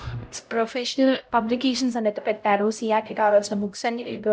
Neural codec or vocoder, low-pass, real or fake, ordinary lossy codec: codec, 16 kHz, 0.5 kbps, X-Codec, HuBERT features, trained on LibriSpeech; none; fake; none